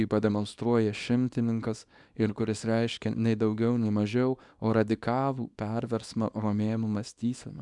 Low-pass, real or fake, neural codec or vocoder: 10.8 kHz; fake; codec, 24 kHz, 0.9 kbps, WavTokenizer, small release